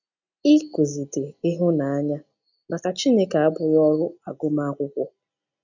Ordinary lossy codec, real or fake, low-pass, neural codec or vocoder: none; real; 7.2 kHz; none